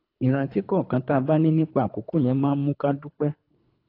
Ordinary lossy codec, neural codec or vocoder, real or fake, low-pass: AAC, 32 kbps; codec, 24 kHz, 3 kbps, HILCodec; fake; 5.4 kHz